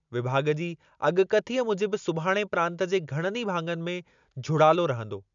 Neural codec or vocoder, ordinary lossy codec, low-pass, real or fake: none; none; 7.2 kHz; real